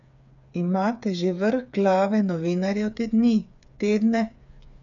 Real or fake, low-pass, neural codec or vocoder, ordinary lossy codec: fake; 7.2 kHz; codec, 16 kHz, 8 kbps, FreqCodec, smaller model; none